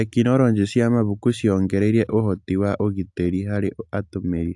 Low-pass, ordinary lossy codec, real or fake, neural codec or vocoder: 10.8 kHz; AAC, 64 kbps; real; none